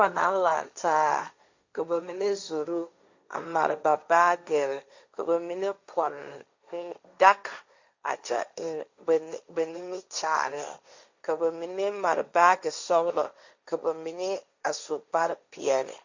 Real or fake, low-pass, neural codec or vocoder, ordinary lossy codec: fake; 7.2 kHz; codec, 16 kHz, 1.1 kbps, Voila-Tokenizer; Opus, 64 kbps